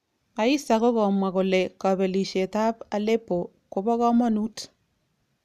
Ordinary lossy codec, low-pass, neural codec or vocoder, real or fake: none; 14.4 kHz; none; real